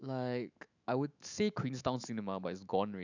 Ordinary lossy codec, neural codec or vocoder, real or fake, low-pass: none; autoencoder, 48 kHz, 128 numbers a frame, DAC-VAE, trained on Japanese speech; fake; 7.2 kHz